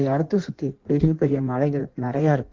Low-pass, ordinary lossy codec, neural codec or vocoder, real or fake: 7.2 kHz; Opus, 16 kbps; codec, 16 kHz in and 24 kHz out, 1.1 kbps, FireRedTTS-2 codec; fake